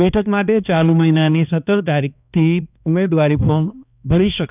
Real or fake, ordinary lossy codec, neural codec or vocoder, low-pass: fake; none; codec, 16 kHz, 2 kbps, X-Codec, HuBERT features, trained on balanced general audio; 3.6 kHz